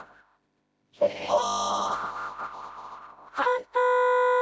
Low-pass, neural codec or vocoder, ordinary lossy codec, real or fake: none; codec, 16 kHz, 0.5 kbps, FreqCodec, smaller model; none; fake